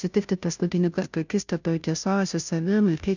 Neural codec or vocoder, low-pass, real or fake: codec, 16 kHz, 0.5 kbps, FunCodec, trained on Chinese and English, 25 frames a second; 7.2 kHz; fake